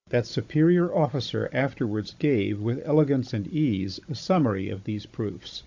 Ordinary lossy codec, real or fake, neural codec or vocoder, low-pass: AAC, 48 kbps; fake; codec, 16 kHz, 16 kbps, FunCodec, trained on Chinese and English, 50 frames a second; 7.2 kHz